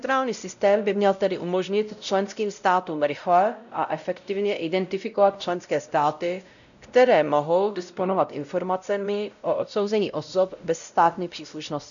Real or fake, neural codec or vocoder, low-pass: fake; codec, 16 kHz, 0.5 kbps, X-Codec, WavLM features, trained on Multilingual LibriSpeech; 7.2 kHz